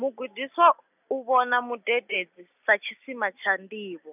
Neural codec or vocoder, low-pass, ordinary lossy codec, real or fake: none; 3.6 kHz; none; real